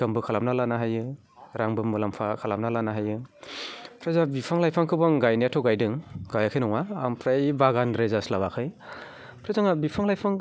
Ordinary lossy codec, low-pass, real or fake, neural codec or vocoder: none; none; real; none